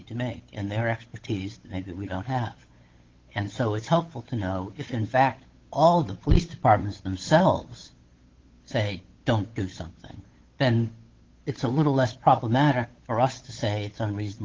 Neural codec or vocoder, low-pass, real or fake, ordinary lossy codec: codec, 16 kHz, 8 kbps, FunCodec, trained on Chinese and English, 25 frames a second; 7.2 kHz; fake; Opus, 16 kbps